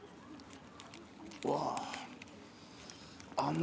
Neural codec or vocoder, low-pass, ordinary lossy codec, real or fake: none; none; none; real